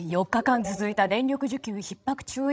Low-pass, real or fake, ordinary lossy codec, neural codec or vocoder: none; fake; none; codec, 16 kHz, 16 kbps, FreqCodec, larger model